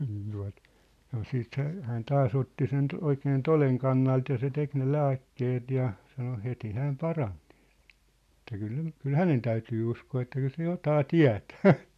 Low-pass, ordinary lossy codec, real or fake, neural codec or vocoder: 14.4 kHz; none; real; none